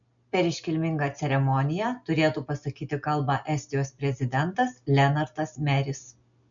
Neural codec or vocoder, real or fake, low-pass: none; real; 7.2 kHz